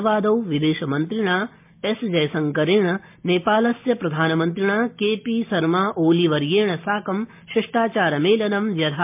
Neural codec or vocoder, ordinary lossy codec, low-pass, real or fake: none; MP3, 32 kbps; 3.6 kHz; real